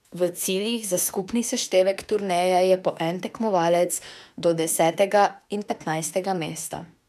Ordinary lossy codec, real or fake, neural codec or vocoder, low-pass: none; fake; autoencoder, 48 kHz, 32 numbers a frame, DAC-VAE, trained on Japanese speech; 14.4 kHz